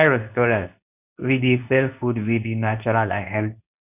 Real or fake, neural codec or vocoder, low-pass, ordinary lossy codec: fake; codec, 24 kHz, 0.9 kbps, WavTokenizer, medium speech release version 2; 3.6 kHz; none